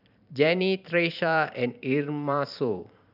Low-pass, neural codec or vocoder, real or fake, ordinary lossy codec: 5.4 kHz; vocoder, 44.1 kHz, 128 mel bands every 512 samples, BigVGAN v2; fake; none